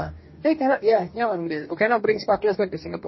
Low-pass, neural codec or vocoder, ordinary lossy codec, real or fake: 7.2 kHz; codec, 44.1 kHz, 2.6 kbps, DAC; MP3, 24 kbps; fake